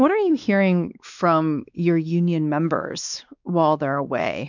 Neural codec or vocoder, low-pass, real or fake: codec, 16 kHz, 2 kbps, X-Codec, WavLM features, trained on Multilingual LibriSpeech; 7.2 kHz; fake